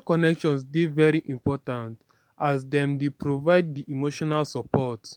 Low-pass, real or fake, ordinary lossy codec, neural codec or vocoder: 19.8 kHz; fake; MP3, 96 kbps; codec, 44.1 kHz, 7.8 kbps, DAC